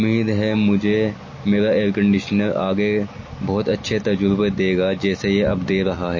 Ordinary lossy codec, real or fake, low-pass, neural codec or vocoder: MP3, 32 kbps; real; 7.2 kHz; none